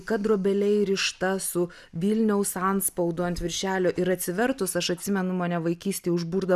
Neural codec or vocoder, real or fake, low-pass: none; real; 14.4 kHz